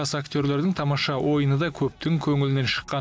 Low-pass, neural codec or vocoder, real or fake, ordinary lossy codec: none; none; real; none